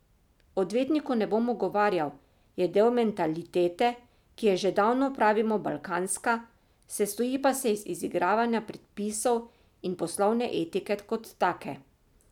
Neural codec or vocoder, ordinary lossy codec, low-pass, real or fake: none; none; 19.8 kHz; real